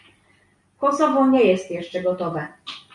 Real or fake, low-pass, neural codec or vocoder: real; 10.8 kHz; none